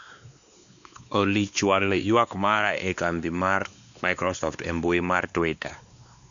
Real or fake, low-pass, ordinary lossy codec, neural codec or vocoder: fake; 7.2 kHz; none; codec, 16 kHz, 2 kbps, X-Codec, WavLM features, trained on Multilingual LibriSpeech